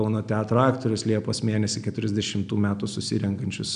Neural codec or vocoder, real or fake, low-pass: none; real; 9.9 kHz